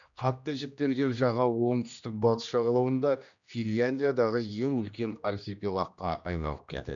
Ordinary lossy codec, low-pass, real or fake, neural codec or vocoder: none; 7.2 kHz; fake; codec, 16 kHz, 1 kbps, X-Codec, HuBERT features, trained on general audio